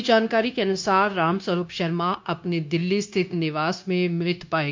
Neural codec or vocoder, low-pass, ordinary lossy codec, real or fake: codec, 16 kHz, 0.9 kbps, LongCat-Audio-Codec; 7.2 kHz; MP3, 64 kbps; fake